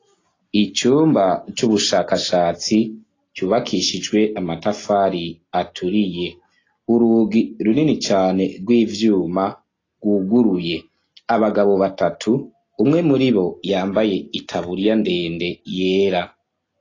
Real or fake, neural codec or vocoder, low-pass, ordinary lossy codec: real; none; 7.2 kHz; AAC, 32 kbps